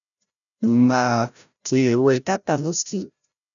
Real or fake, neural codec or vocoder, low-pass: fake; codec, 16 kHz, 0.5 kbps, FreqCodec, larger model; 7.2 kHz